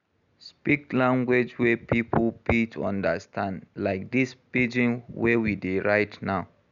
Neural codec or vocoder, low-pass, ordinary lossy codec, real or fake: none; 7.2 kHz; none; real